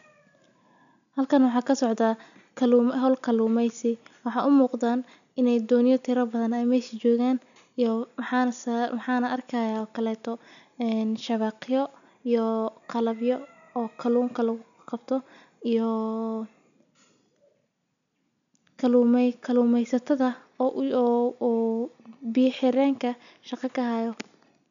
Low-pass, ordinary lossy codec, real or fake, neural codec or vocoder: 7.2 kHz; none; real; none